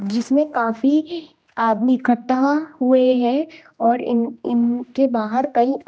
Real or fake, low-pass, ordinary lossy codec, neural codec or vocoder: fake; none; none; codec, 16 kHz, 1 kbps, X-Codec, HuBERT features, trained on general audio